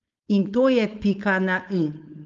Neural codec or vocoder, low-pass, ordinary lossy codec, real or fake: codec, 16 kHz, 4.8 kbps, FACodec; 7.2 kHz; Opus, 32 kbps; fake